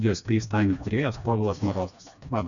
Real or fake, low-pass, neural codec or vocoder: fake; 7.2 kHz; codec, 16 kHz, 2 kbps, FreqCodec, smaller model